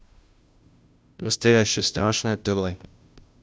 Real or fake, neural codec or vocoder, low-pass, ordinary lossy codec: fake; codec, 16 kHz, 0.5 kbps, FunCodec, trained on Chinese and English, 25 frames a second; none; none